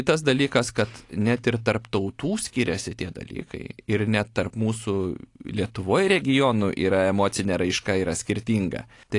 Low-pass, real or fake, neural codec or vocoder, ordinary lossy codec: 10.8 kHz; real; none; AAC, 48 kbps